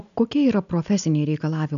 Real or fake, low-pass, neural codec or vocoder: real; 7.2 kHz; none